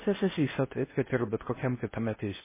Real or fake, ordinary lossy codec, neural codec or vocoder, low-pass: fake; MP3, 16 kbps; codec, 16 kHz in and 24 kHz out, 0.6 kbps, FocalCodec, streaming, 4096 codes; 3.6 kHz